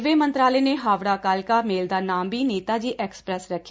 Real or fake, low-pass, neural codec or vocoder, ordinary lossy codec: real; none; none; none